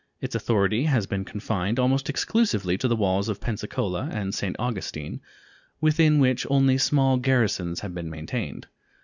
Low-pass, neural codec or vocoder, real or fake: 7.2 kHz; none; real